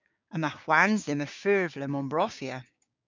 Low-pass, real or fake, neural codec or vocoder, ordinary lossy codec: 7.2 kHz; fake; codec, 16 kHz, 6 kbps, DAC; MP3, 64 kbps